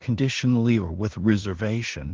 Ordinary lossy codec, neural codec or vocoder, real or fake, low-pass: Opus, 16 kbps; codec, 16 kHz in and 24 kHz out, 0.4 kbps, LongCat-Audio-Codec, two codebook decoder; fake; 7.2 kHz